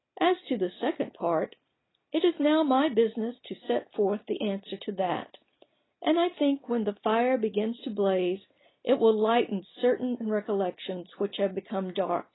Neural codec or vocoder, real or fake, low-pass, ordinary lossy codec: none; real; 7.2 kHz; AAC, 16 kbps